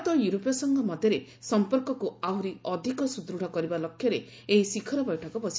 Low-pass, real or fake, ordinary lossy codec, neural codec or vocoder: none; real; none; none